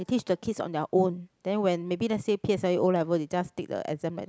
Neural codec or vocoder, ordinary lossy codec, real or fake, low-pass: none; none; real; none